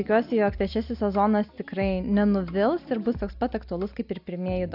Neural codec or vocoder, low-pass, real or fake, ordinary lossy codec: none; 5.4 kHz; real; AAC, 48 kbps